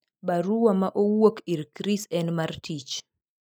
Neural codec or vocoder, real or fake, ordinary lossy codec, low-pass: none; real; none; none